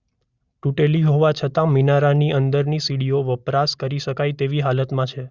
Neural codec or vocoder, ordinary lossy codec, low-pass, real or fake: none; Opus, 64 kbps; 7.2 kHz; real